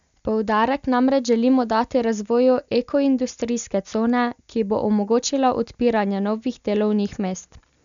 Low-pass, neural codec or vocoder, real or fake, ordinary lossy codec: 7.2 kHz; none; real; none